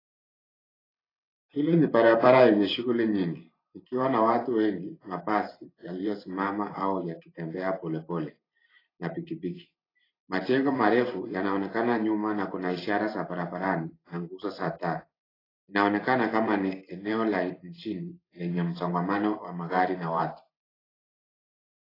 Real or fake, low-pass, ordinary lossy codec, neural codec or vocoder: fake; 5.4 kHz; AAC, 24 kbps; vocoder, 44.1 kHz, 128 mel bands every 512 samples, BigVGAN v2